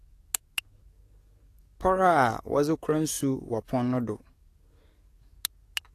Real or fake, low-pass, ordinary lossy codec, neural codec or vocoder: fake; 14.4 kHz; AAC, 48 kbps; codec, 44.1 kHz, 7.8 kbps, DAC